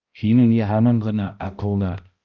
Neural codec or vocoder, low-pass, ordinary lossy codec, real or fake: codec, 16 kHz, 0.5 kbps, X-Codec, HuBERT features, trained on balanced general audio; 7.2 kHz; Opus, 32 kbps; fake